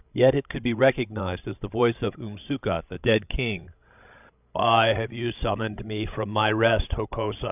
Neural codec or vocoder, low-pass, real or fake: codec, 16 kHz, 16 kbps, FreqCodec, larger model; 3.6 kHz; fake